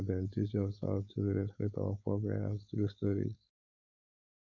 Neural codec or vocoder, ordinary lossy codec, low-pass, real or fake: codec, 16 kHz, 4.8 kbps, FACodec; none; 7.2 kHz; fake